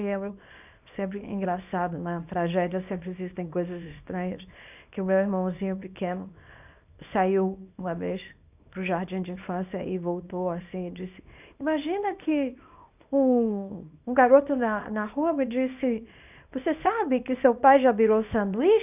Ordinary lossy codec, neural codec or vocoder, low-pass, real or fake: none; codec, 24 kHz, 0.9 kbps, WavTokenizer, small release; 3.6 kHz; fake